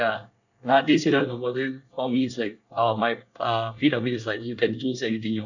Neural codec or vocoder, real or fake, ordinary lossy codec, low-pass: codec, 24 kHz, 1 kbps, SNAC; fake; none; 7.2 kHz